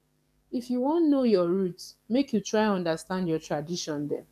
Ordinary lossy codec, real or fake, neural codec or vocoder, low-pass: none; fake; codec, 44.1 kHz, 7.8 kbps, DAC; 14.4 kHz